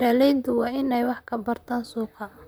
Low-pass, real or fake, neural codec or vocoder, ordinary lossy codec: none; fake; vocoder, 44.1 kHz, 128 mel bands, Pupu-Vocoder; none